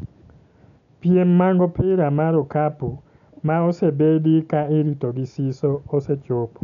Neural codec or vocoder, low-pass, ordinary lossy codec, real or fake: none; 7.2 kHz; none; real